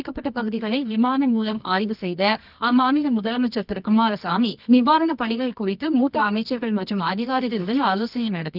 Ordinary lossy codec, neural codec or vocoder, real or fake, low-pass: none; codec, 24 kHz, 0.9 kbps, WavTokenizer, medium music audio release; fake; 5.4 kHz